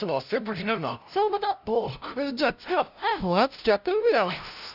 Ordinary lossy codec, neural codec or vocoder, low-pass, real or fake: none; codec, 16 kHz, 0.5 kbps, FunCodec, trained on LibriTTS, 25 frames a second; 5.4 kHz; fake